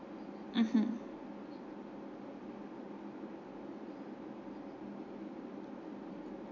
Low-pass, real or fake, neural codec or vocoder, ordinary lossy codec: 7.2 kHz; real; none; MP3, 48 kbps